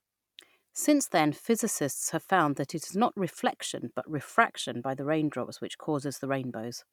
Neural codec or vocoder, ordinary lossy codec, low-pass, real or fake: none; none; 19.8 kHz; real